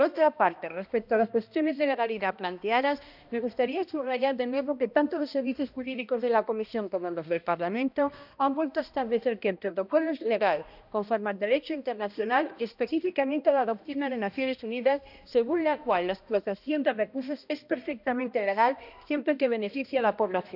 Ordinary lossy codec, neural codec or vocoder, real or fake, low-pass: none; codec, 16 kHz, 1 kbps, X-Codec, HuBERT features, trained on balanced general audio; fake; 5.4 kHz